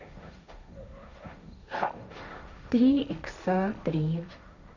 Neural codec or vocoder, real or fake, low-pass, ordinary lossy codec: codec, 16 kHz, 1.1 kbps, Voila-Tokenizer; fake; 7.2 kHz; none